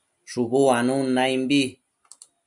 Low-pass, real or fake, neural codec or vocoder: 10.8 kHz; real; none